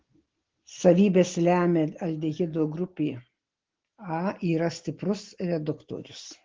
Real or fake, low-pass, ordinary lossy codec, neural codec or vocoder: real; 7.2 kHz; Opus, 16 kbps; none